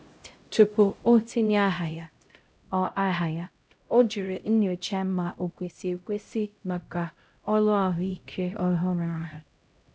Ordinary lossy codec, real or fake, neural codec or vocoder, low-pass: none; fake; codec, 16 kHz, 0.5 kbps, X-Codec, HuBERT features, trained on LibriSpeech; none